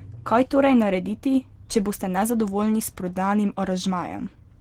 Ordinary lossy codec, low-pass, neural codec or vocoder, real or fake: Opus, 16 kbps; 19.8 kHz; none; real